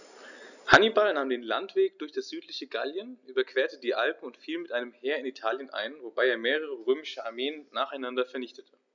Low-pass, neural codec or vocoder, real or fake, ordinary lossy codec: 7.2 kHz; none; real; none